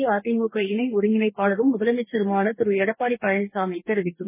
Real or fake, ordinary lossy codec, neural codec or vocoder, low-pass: fake; MP3, 16 kbps; codec, 44.1 kHz, 2.6 kbps, DAC; 3.6 kHz